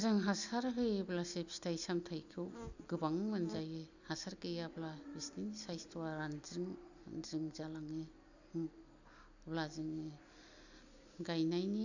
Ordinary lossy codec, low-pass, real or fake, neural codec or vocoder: none; 7.2 kHz; real; none